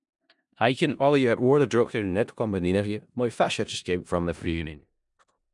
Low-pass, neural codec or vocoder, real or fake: 10.8 kHz; codec, 16 kHz in and 24 kHz out, 0.4 kbps, LongCat-Audio-Codec, four codebook decoder; fake